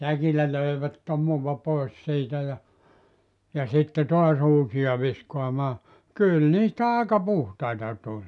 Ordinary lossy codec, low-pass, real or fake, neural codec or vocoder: none; 10.8 kHz; real; none